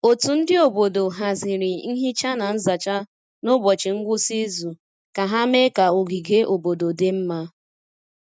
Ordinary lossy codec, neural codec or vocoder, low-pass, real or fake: none; none; none; real